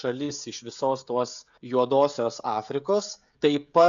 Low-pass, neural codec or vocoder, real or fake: 7.2 kHz; codec, 16 kHz, 16 kbps, FreqCodec, smaller model; fake